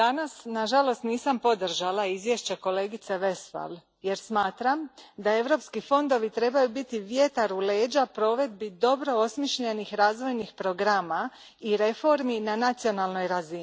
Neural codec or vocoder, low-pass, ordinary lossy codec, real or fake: none; none; none; real